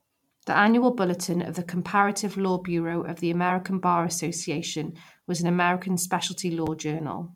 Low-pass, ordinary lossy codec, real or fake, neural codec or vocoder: 19.8 kHz; MP3, 96 kbps; fake; vocoder, 44.1 kHz, 128 mel bands every 512 samples, BigVGAN v2